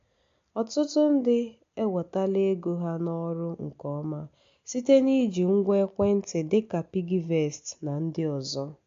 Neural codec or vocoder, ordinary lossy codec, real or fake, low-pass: none; AAC, 48 kbps; real; 7.2 kHz